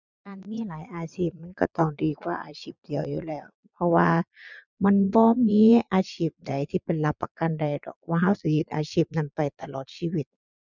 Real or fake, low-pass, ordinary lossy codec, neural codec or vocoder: fake; 7.2 kHz; none; vocoder, 22.05 kHz, 80 mel bands, Vocos